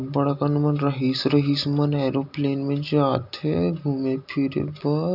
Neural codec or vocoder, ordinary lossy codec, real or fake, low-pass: none; none; real; 5.4 kHz